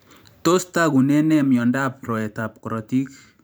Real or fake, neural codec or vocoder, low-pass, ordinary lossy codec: fake; vocoder, 44.1 kHz, 128 mel bands every 512 samples, BigVGAN v2; none; none